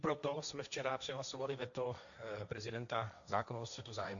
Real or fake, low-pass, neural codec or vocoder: fake; 7.2 kHz; codec, 16 kHz, 1.1 kbps, Voila-Tokenizer